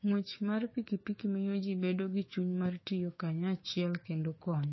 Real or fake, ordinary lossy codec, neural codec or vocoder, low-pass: fake; MP3, 24 kbps; codec, 16 kHz, 6 kbps, DAC; 7.2 kHz